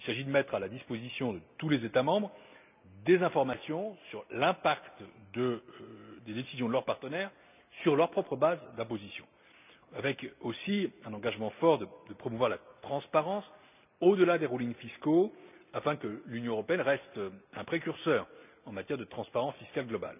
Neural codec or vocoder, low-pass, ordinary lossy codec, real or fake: none; 3.6 kHz; none; real